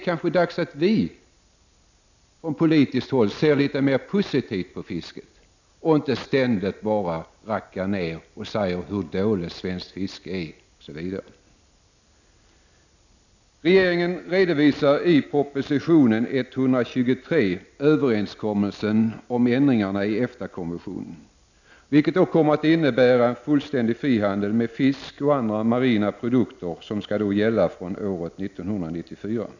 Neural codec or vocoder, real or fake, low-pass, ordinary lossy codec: none; real; 7.2 kHz; none